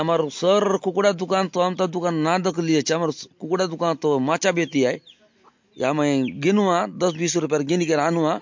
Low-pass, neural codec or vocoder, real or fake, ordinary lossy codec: 7.2 kHz; none; real; MP3, 48 kbps